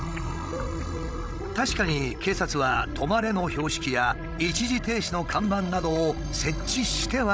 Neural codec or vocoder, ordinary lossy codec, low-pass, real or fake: codec, 16 kHz, 16 kbps, FreqCodec, larger model; none; none; fake